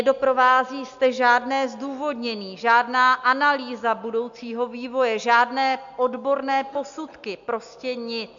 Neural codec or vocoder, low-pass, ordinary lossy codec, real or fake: none; 7.2 kHz; MP3, 64 kbps; real